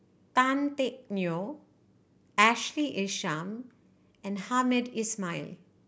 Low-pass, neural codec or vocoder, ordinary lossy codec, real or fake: none; none; none; real